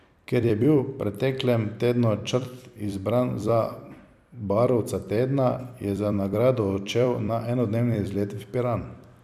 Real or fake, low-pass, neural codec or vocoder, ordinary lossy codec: fake; 14.4 kHz; vocoder, 44.1 kHz, 128 mel bands every 256 samples, BigVGAN v2; none